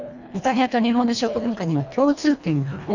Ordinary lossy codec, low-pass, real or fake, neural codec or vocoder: none; 7.2 kHz; fake; codec, 24 kHz, 1.5 kbps, HILCodec